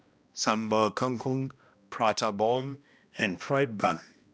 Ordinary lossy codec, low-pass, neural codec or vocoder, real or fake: none; none; codec, 16 kHz, 1 kbps, X-Codec, HuBERT features, trained on balanced general audio; fake